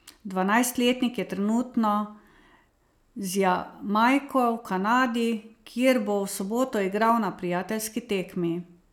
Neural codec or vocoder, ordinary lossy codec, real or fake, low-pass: none; none; real; 19.8 kHz